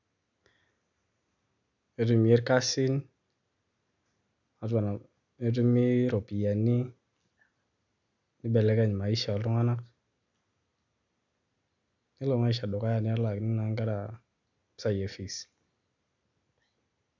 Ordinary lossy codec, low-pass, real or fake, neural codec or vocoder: none; 7.2 kHz; real; none